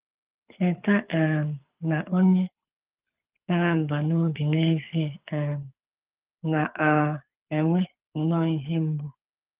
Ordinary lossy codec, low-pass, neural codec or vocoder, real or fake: Opus, 32 kbps; 3.6 kHz; codec, 24 kHz, 6 kbps, HILCodec; fake